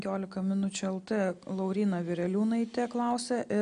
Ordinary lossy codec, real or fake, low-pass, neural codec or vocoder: AAC, 96 kbps; real; 9.9 kHz; none